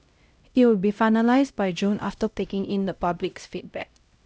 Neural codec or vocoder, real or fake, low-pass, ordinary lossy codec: codec, 16 kHz, 0.5 kbps, X-Codec, HuBERT features, trained on LibriSpeech; fake; none; none